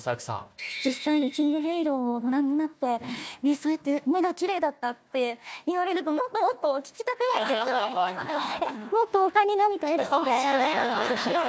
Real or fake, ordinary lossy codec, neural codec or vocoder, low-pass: fake; none; codec, 16 kHz, 1 kbps, FunCodec, trained on Chinese and English, 50 frames a second; none